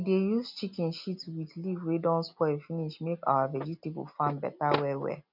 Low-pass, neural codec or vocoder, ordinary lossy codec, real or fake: 5.4 kHz; none; none; real